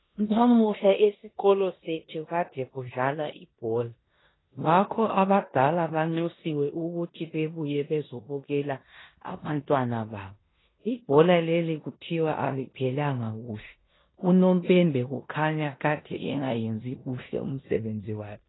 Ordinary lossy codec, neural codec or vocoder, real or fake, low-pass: AAC, 16 kbps; codec, 16 kHz in and 24 kHz out, 0.9 kbps, LongCat-Audio-Codec, four codebook decoder; fake; 7.2 kHz